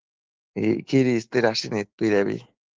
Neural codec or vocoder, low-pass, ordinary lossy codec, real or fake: autoencoder, 48 kHz, 128 numbers a frame, DAC-VAE, trained on Japanese speech; 7.2 kHz; Opus, 16 kbps; fake